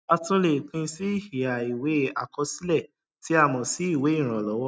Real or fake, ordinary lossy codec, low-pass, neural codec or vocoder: real; none; none; none